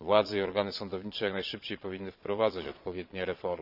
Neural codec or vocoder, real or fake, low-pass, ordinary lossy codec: vocoder, 22.05 kHz, 80 mel bands, Vocos; fake; 5.4 kHz; none